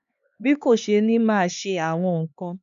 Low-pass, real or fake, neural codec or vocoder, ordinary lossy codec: 7.2 kHz; fake; codec, 16 kHz, 2 kbps, X-Codec, HuBERT features, trained on LibriSpeech; none